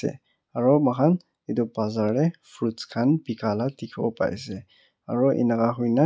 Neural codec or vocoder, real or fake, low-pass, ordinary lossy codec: none; real; none; none